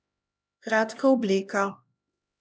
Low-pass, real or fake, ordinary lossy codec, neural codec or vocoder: none; fake; none; codec, 16 kHz, 1 kbps, X-Codec, HuBERT features, trained on LibriSpeech